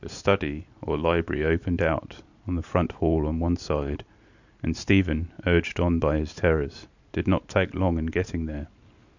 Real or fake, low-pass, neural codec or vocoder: real; 7.2 kHz; none